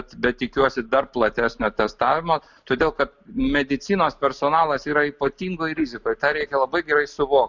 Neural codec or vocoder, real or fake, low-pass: none; real; 7.2 kHz